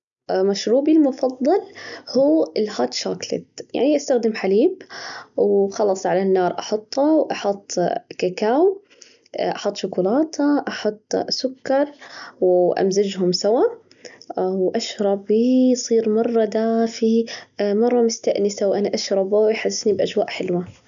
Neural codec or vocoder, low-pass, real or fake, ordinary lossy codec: none; 7.2 kHz; real; none